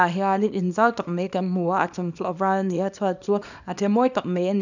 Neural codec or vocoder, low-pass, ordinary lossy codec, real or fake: codec, 24 kHz, 0.9 kbps, WavTokenizer, small release; 7.2 kHz; none; fake